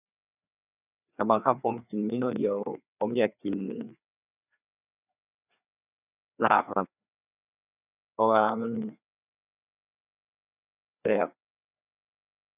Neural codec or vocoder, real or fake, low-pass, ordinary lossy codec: codec, 16 kHz, 2 kbps, FreqCodec, larger model; fake; 3.6 kHz; none